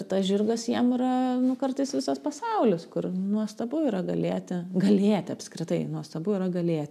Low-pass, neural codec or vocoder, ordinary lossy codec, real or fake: 14.4 kHz; none; MP3, 96 kbps; real